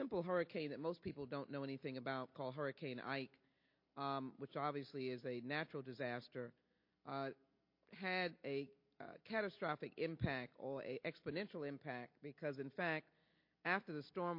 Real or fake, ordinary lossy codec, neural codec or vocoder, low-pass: real; MP3, 32 kbps; none; 5.4 kHz